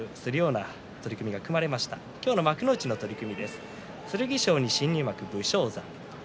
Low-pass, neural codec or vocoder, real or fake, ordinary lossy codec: none; none; real; none